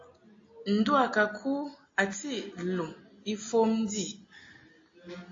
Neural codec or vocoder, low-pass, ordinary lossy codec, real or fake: none; 7.2 kHz; AAC, 32 kbps; real